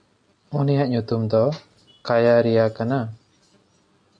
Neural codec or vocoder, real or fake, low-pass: none; real; 9.9 kHz